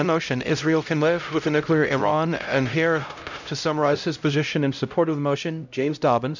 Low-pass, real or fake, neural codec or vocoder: 7.2 kHz; fake; codec, 16 kHz, 0.5 kbps, X-Codec, HuBERT features, trained on LibriSpeech